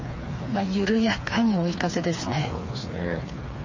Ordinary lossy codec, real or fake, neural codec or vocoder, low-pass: MP3, 32 kbps; fake; codec, 16 kHz, 4 kbps, FreqCodec, smaller model; 7.2 kHz